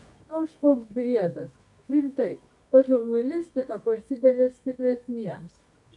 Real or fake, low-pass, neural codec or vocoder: fake; 10.8 kHz; codec, 24 kHz, 0.9 kbps, WavTokenizer, medium music audio release